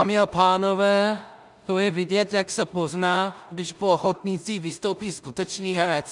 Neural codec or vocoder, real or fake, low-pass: codec, 16 kHz in and 24 kHz out, 0.4 kbps, LongCat-Audio-Codec, two codebook decoder; fake; 10.8 kHz